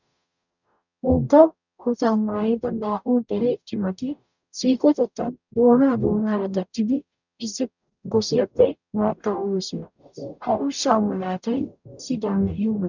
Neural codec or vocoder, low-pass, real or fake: codec, 44.1 kHz, 0.9 kbps, DAC; 7.2 kHz; fake